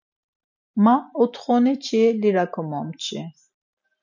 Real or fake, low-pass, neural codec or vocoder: real; 7.2 kHz; none